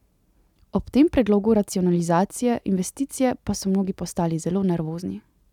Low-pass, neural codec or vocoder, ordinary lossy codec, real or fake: 19.8 kHz; none; none; real